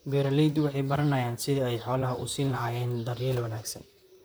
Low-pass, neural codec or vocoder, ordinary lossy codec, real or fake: none; vocoder, 44.1 kHz, 128 mel bands, Pupu-Vocoder; none; fake